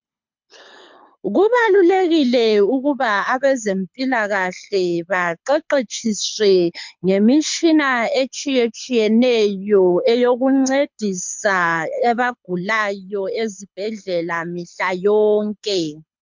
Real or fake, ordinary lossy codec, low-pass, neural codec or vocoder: fake; MP3, 64 kbps; 7.2 kHz; codec, 24 kHz, 6 kbps, HILCodec